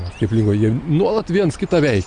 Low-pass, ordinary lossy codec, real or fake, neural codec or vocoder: 9.9 kHz; Opus, 64 kbps; real; none